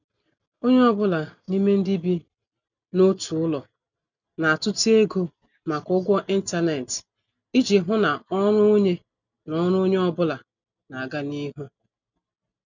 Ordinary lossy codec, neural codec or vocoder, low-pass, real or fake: none; none; 7.2 kHz; real